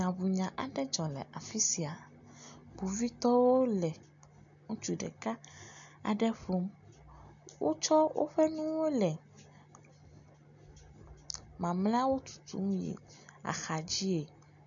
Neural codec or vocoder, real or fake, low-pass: none; real; 7.2 kHz